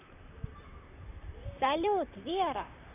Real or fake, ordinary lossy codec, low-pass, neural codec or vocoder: fake; none; 3.6 kHz; vocoder, 44.1 kHz, 128 mel bands, Pupu-Vocoder